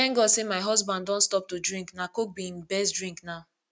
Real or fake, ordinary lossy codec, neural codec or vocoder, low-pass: real; none; none; none